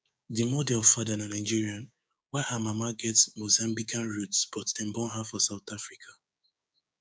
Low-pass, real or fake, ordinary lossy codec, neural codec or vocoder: none; fake; none; codec, 16 kHz, 6 kbps, DAC